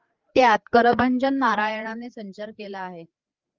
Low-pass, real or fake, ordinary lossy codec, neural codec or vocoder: 7.2 kHz; fake; Opus, 32 kbps; codec, 16 kHz, 4 kbps, FreqCodec, larger model